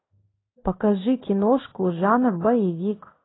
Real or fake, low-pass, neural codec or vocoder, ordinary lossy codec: fake; 7.2 kHz; codec, 16 kHz in and 24 kHz out, 1 kbps, XY-Tokenizer; AAC, 16 kbps